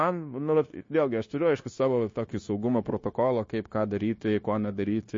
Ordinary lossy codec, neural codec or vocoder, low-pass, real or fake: MP3, 32 kbps; codec, 16 kHz, 0.9 kbps, LongCat-Audio-Codec; 7.2 kHz; fake